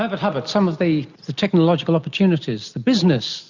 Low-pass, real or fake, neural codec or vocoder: 7.2 kHz; real; none